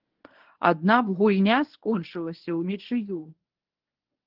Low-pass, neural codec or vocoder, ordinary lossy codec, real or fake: 5.4 kHz; codec, 24 kHz, 0.9 kbps, WavTokenizer, medium speech release version 1; Opus, 16 kbps; fake